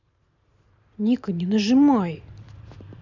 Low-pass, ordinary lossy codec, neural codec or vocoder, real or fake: 7.2 kHz; none; vocoder, 44.1 kHz, 128 mel bands every 512 samples, BigVGAN v2; fake